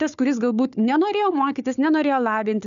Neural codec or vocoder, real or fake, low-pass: codec, 16 kHz, 4 kbps, FunCodec, trained on Chinese and English, 50 frames a second; fake; 7.2 kHz